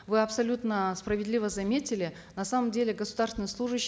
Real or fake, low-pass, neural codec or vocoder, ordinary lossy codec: real; none; none; none